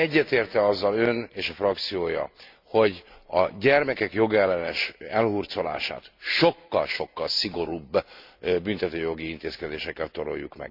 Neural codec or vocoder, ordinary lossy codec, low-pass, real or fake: none; MP3, 48 kbps; 5.4 kHz; real